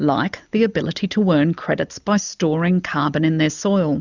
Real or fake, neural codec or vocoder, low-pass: real; none; 7.2 kHz